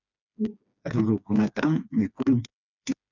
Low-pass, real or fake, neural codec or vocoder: 7.2 kHz; fake; codec, 16 kHz, 2 kbps, FreqCodec, smaller model